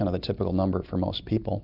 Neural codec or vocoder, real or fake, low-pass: none; real; 5.4 kHz